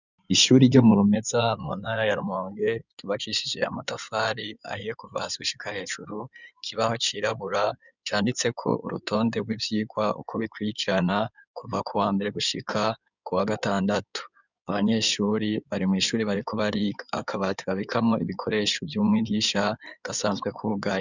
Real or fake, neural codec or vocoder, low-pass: fake; codec, 16 kHz in and 24 kHz out, 2.2 kbps, FireRedTTS-2 codec; 7.2 kHz